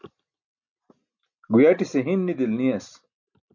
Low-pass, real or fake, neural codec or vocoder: 7.2 kHz; real; none